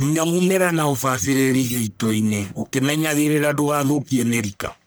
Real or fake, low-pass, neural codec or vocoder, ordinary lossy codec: fake; none; codec, 44.1 kHz, 1.7 kbps, Pupu-Codec; none